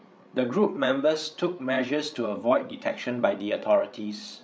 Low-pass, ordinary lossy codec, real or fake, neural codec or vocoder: none; none; fake; codec, 16 kHz, 8 kbps, FreqCodec, larger model